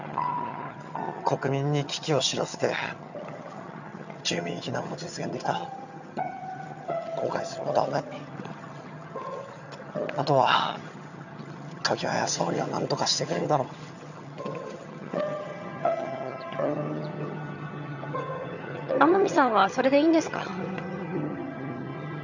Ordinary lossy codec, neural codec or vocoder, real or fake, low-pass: none; vocoder, 22.05 kHz, 80 mel bands, HiFi-GAN; fake; 7.2 kHz